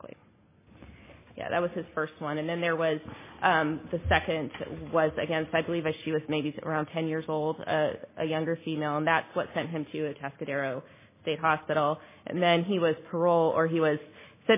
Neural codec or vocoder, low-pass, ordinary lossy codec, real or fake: none; 3.6 kHz; MP3, 16 kbps; real